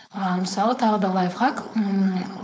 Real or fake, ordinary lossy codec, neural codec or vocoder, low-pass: fake; none; codec, 16 kHz, 4.8 kbps, FACodec; none